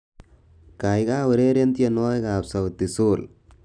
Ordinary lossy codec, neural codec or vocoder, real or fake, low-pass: none; none; real; 9.9 kHz